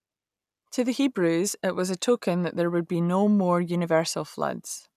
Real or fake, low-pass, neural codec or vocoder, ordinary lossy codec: real; 14.4 kHz; none; none